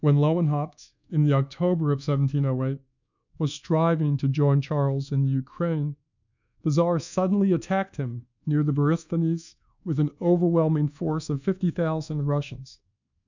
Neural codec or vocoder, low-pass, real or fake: codec, 24 kHz, 1.2 kbps, DualCodec; 7.2 kHz; fake